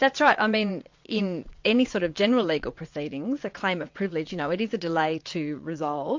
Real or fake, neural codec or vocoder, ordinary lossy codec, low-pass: fake; vocoder, 22.05 kHz, 80 mel bands, WaveNeXt; MP3, 48 kbps; 7.2 kHz